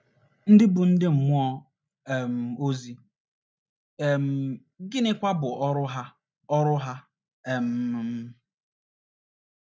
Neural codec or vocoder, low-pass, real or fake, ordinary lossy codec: none; none; real; none